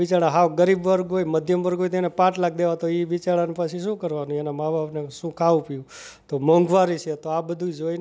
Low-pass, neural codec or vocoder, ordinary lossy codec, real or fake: none; none; none; real